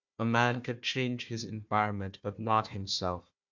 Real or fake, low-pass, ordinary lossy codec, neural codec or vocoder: fake; 7.2 kHz; MP3, 64 kbps; codec, 16 kHz, 1 kbps, FunCodec, trained on Chinese and English, 50 frames a second